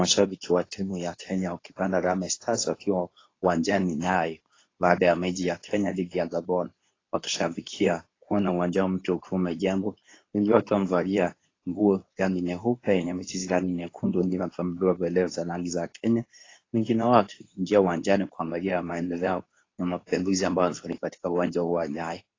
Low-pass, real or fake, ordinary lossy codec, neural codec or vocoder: 7.2 kHz; fake; AAC, 32 kbps; codec, 24 kHz, 0.9 kbps, WavTokenizer, medium speech release version 2